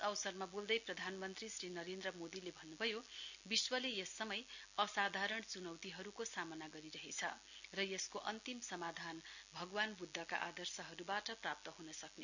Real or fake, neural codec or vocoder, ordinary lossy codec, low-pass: real; none; none; 7.2 kHz